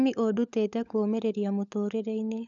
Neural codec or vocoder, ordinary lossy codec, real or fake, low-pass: codec, 16 kHz, 16 kbps, FunCodec, trained on LibriTTS, 50 frames a second; none; fake; 7.2 kHz